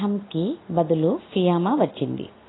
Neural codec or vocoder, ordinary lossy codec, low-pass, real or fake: none; AAC, 16 kbps; 7.2 kHz; real